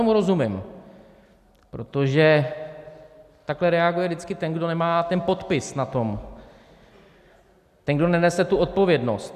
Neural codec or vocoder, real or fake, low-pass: none; real; 14.4 kHz